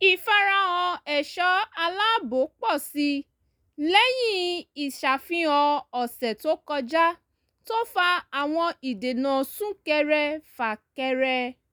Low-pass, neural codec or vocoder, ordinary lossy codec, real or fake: none; none; none; real